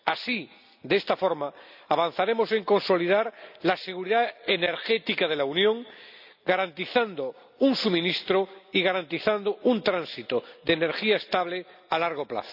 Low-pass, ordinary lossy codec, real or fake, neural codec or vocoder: 5.4 kHz; none; real; none